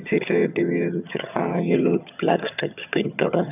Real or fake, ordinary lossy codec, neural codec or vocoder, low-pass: fake; none; vocoder, 22.05 kHz, 80 mel bands, HiFi-GAN; 3.6 kHz